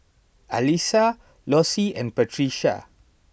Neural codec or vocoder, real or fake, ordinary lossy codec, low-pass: none; real; none; none